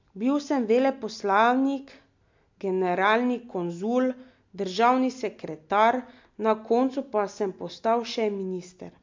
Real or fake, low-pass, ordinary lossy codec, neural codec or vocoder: real; 7.2 kHz; MP3, 48 kbps; none